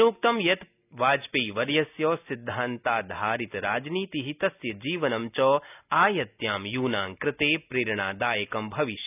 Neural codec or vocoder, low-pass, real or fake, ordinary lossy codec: none; 3.6 kHz; real; none